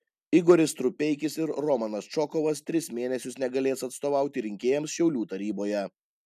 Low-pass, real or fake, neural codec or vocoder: 14.4 kHz; real; none